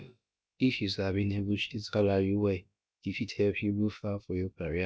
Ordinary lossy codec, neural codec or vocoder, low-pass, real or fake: none; codec, 16 kHz, about 1 kbps, DyCAST, with the encoder's durations; none; fake